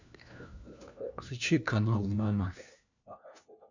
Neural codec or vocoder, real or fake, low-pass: codec, 16 kHz, 1 kbps, FunCodec, trained on LibriTTS, 50 frames a second; fake; 7.2 kHz